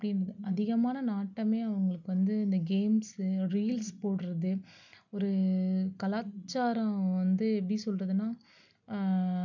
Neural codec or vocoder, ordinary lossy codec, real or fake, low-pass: none; none; real; 7.2 kHz